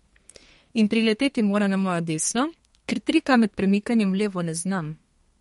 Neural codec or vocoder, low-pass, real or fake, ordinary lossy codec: codec, 32 kHz, 1.9 kbps, SNAC; 14.4 kHz; fake; MP3, 48 kbps